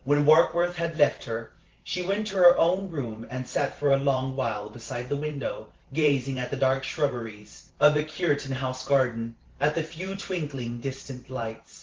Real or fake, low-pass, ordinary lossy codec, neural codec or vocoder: fake; 7.2 kHz; Opus, 16 kbps; vocoder, 44.1 kHz, 128 mel bands every 512 samples, BigVGAN v2